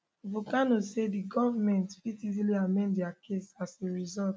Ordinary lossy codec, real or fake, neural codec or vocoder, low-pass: none; real; none; none